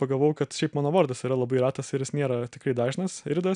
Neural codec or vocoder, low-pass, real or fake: none; 9.9 kHz; real